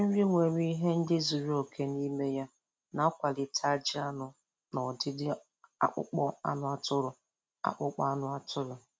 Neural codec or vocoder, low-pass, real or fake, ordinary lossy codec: none; none; real; none